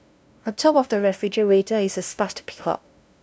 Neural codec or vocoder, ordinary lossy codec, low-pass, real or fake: codec, 16 kHz, 0.5 kbps, FunCodec, trained on LibriTTS, 25 frames a second; none; none; fake